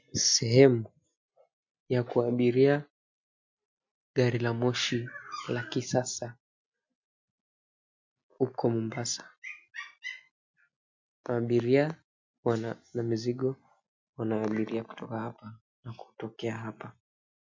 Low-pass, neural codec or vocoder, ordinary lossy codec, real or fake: 7.2 kHz; none; MP3, 48 kbps; real